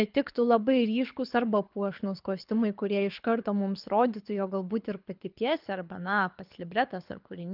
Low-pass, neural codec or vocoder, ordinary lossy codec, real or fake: 5.4 kHz; codec, 24 kHz, 6 kbps, HILCodec; Opus, 24 kbps; fake